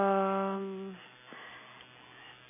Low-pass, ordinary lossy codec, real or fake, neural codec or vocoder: 3.6 kHz; MP3, 16 kbps; real; none